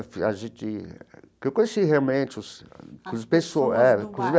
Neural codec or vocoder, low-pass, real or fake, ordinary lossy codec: none; none; real; none